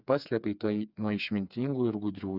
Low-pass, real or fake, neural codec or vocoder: 5.4 kHz; fake; codec, 16 kHz, 4 kbps, FreqCodec, smaller model